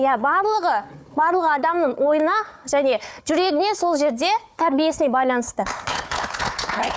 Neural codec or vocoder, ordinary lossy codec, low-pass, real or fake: codec, 16 kHz, 4 kbps, FunCodec, trained on Chinese and English, 50 frames a second; none; none; fake